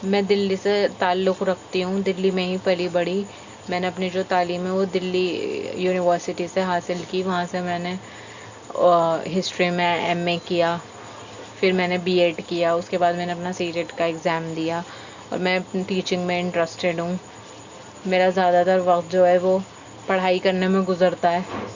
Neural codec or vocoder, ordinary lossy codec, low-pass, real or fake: none; Opus, 64 kbps; 7.2 kHz; real